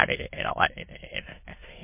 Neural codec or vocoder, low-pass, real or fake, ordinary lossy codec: autoencoder, 22.05 kHz, a latent of 192 numbers a frame, VITS, trained on many speakers; 3.6 kHz; fake; MP3, 24 kbps